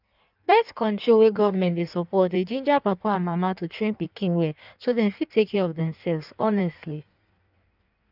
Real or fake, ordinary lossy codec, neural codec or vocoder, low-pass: fake; none; codec, 16 kHz in and 24 kHz out, 1.1 kbps, FireRedTTS-2 codec; 5.4 kHz